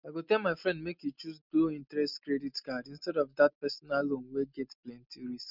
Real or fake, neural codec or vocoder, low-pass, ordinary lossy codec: real; none; 5.4 kHz; none